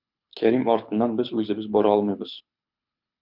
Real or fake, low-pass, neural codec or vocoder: fake; 5.4 kHz; codec, 24 kHz, 6 kbps, HILCodec